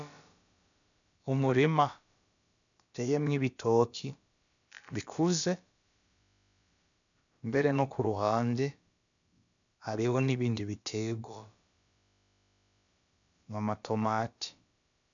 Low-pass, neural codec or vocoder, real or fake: 7.2 kHz; codec, 16 kHz, about 1 kbps, DyCAST, with the encoder's durations; fake